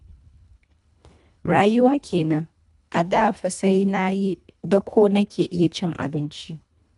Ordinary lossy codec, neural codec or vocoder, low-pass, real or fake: none; codec, 24 kHz, 1.5 kbps, HILCodec; 10.8 kHz; fake